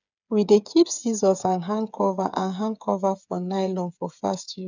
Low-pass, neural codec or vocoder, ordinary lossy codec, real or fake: 7.2 kHz; codec, 16 kHz, 8 kbps, FreqCodec, smaller model; none; fake